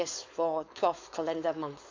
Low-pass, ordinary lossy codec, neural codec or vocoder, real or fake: 7.2 kHz; MP3, 48 kbps; codec, 16 kHz, 4.8 kbps, FACodec; fake